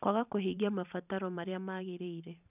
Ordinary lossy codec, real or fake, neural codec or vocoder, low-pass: none; fake; vocoder, 44.1 kHz, 128 mel bands every 512 samples, BigVGAN v2; 3.6 kHz